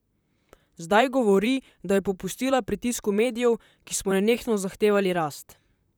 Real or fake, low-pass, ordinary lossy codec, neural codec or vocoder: fake; none; none; vocoder, 44.1 kHz, 128 mel bands, Pupu-Vocoder